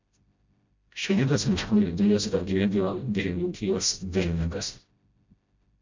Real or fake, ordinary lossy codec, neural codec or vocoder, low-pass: fake; MP3, 64 kbps; codec, 16 kHz, 0.5 kbps, FreqCodec, smaller model; 7.2 kHz